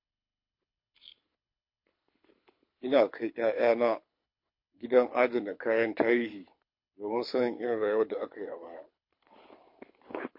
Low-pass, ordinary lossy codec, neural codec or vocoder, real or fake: 5.4 kHz; MP3, 32 kbps; codec, 24 kHz, 6 kbps, HILCodec; fake